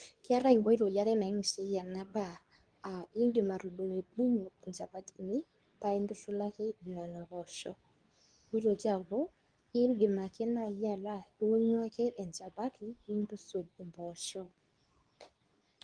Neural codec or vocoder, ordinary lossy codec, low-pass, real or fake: codec, 24 kHz, 0.9 kbps, WavTokenizer, medium speech release version 2; Opus, 32 kbps; 9.9 kHz; fake